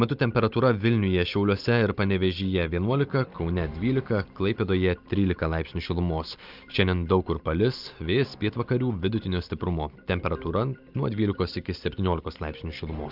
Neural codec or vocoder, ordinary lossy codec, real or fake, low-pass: none; Opus, 24 kbps; real; 5.4 kHz